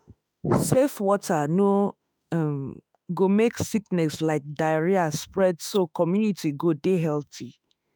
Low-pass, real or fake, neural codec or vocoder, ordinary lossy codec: none; fake; autoencoder, 48 kHz, 32 numbers a frame, DAC-VAE, trained on Japanese speech; none